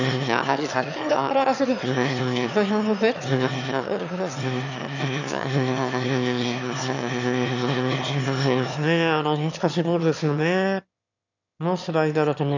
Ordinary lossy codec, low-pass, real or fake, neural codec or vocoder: none; 7.2 kHz; fake; autoencoder, 22.05 kHz, a latent of 192 numbers a frame, VITS, trained on one speaker